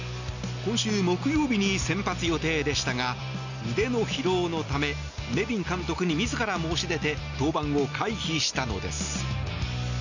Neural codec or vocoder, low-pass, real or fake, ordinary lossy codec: none; 7.2 kHz; real; none